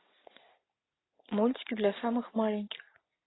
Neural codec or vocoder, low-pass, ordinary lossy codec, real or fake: none; 7.2 kHz; AAC, 16 kbps; real